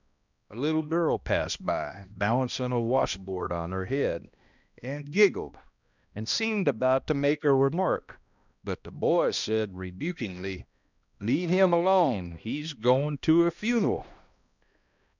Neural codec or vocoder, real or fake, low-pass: codec, 16 kHz, 1 kbps, X-Codec, HuBERT features, trained on balanced general audio; fake; 7.2 kHz